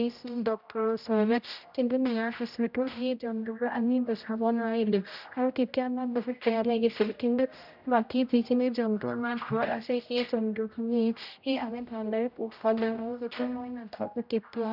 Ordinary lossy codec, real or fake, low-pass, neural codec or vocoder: none; fake; 5.4 kHz; codec, 16 kHz, 0.5 kbps, X-Codec, HuBERT features, trained on general audio